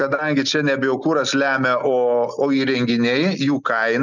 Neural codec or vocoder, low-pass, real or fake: none; 7.2 kHz; real